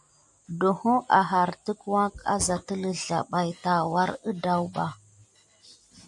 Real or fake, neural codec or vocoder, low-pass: real; none; 10.8 kHz